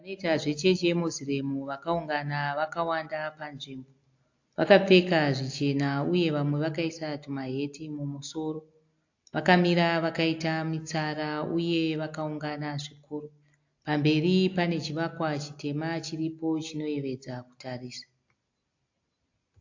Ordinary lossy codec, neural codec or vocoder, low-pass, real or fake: AAC, 48 kbps; none; 7.2 kHz; real